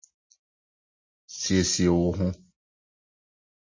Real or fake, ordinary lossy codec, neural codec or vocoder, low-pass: real; MP3, 32 kbps; none; 7.2 kHz